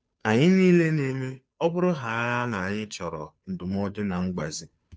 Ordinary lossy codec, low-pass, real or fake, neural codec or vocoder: none; none; fake; codec, 16 kHz, 2 kbps, FunCodec, trained on Chinese and English, 25 frames a second